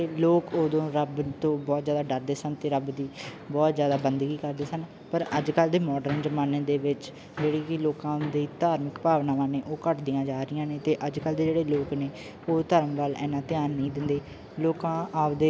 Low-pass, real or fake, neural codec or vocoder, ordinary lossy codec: none; real; none; none